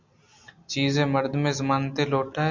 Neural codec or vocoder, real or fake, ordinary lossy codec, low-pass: none; real; MP3, 64 kbps; 7.2 kHz